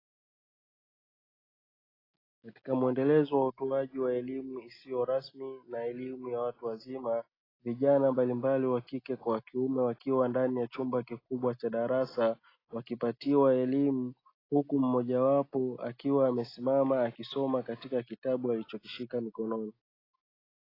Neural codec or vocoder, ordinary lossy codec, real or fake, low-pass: none; AAC, 24 kbps; real; 5.4 kHz